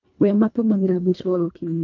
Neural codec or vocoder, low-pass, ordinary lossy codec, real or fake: codec, 24 kHz, 1.5 kbps, HILCodec; 7.2 kHz; MP3, 64 kbps; fake